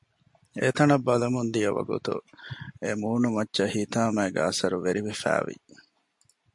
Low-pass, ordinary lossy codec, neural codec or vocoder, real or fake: 10.8 kHz; MP3, 64 kbps; none; real